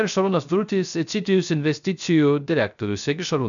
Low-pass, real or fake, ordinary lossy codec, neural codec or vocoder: 7.2 kHz; fake; MP3, 96 kbps; codec, 16 kHz, 0.3 kbps, FocalCodec